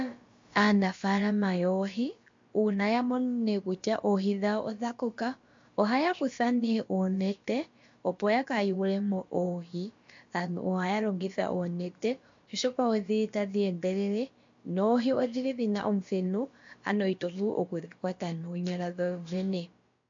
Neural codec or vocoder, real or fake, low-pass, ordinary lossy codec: codec, 16 kHz, about 1 kbps, DyCAST, with the encoder's durations; fake; 7.2 kHz; MP3, 48 kbps